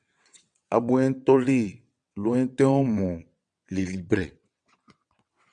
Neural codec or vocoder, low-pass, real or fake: vocoder, 22.05 kHz, 80 mel bands, WaveNeXt; 9.9 kHz; fake